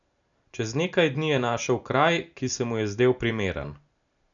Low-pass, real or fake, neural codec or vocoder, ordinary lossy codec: 7.2 kHz; real; none; none